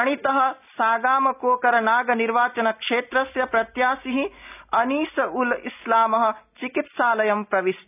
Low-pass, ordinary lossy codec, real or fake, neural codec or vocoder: 3.6 kHz; none; real; none